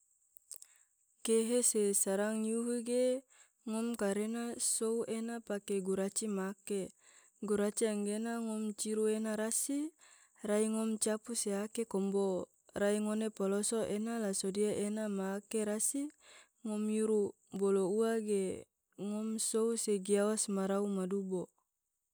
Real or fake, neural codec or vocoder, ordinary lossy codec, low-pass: real; none; none; none